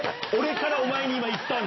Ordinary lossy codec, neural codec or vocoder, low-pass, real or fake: MP3, 24 kbps; none; 7.2 kHz; real